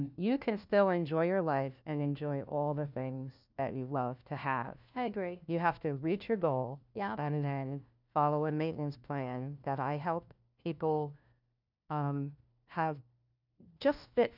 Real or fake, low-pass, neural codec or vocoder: fake; 5.4 kHz; codec, 16 kHz, 1 kbps, FunCodec, trained on LibriTTS, 50 frames a second